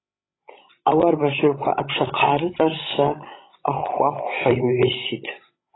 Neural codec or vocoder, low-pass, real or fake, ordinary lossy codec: codec, 16 kHz, 16 kbps, FreqCodec, larger model; 7.2 kHz; fake; AAC, 16 kbps